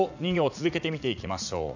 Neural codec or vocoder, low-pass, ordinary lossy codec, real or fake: vocoder, 44.1 kHz, 80 mel bands, Vocos; 7.2 kHz; none; fake